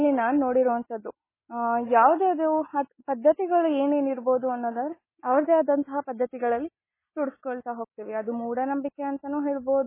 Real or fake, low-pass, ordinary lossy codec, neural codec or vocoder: real; 3.6 kHz; MP3, 16 kbps; none